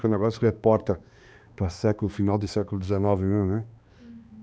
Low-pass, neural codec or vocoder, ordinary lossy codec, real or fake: none; codec, 16 kHz, 2 kbps, X-Codec, HuBERT features, trained on balanced general audio; none; fake